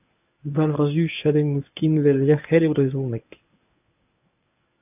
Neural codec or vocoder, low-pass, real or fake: codec, 24 kHz, 0.9 kbps, WavTokenizer, medium speech release version 1; 3.6 kHz; fake